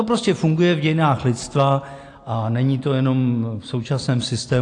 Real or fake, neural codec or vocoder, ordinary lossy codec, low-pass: real; none; AAC, 48 kbps; 9.9 kHz